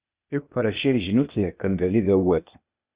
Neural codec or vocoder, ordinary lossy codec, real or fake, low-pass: codec, 16 kHz, 0.8 kbps, ZipCodec; Opus, 64 kbps; fake; 3.6 kHz